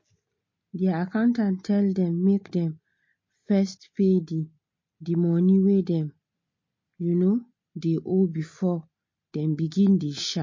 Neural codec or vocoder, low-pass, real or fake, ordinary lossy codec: none; 7.2 kHz; real; MP3, 32 kbps